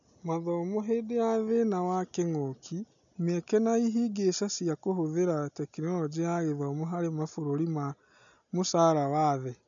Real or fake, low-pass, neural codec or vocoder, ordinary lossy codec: real; 7.2 kHz; none; none